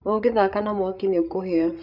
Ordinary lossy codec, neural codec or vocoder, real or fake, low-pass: none; codec, 16 kHz, 8 kbps, FreqCodec, larger model; fake; 5.4 kHz